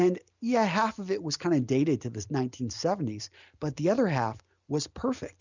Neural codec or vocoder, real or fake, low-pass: none; real; 7.2 kHz